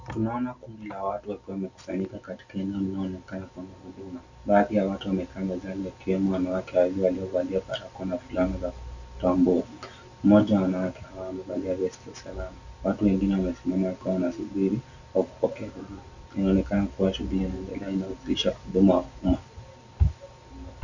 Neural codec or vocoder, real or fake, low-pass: none; real; 7.2 kHz